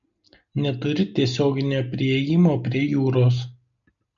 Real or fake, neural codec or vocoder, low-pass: real; none; 7.2 kHz